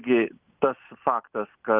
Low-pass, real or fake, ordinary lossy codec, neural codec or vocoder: 3.6 kHz; real; Opus, 32 kbps; none